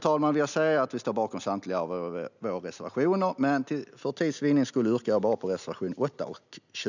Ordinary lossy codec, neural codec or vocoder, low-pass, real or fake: none; none; 7.2 kHz; real